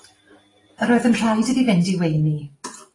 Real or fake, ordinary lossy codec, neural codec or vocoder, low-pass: real; AAC, 32 kbps; none; 10.8 kHz